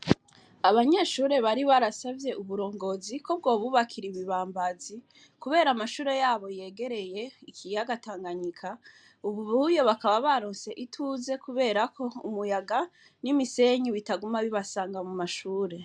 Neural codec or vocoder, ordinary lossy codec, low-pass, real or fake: none; MP3, 96 kbps; 9.9 kHz; real